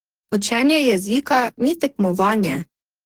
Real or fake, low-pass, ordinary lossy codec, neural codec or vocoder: fake; 19.8 kHz; Opus, 16 kbps; codec, 44.1 kHz, 2.6 kbps, DAC